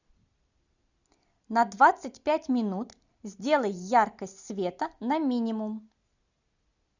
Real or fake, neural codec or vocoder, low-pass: real; none; 7.2 kHz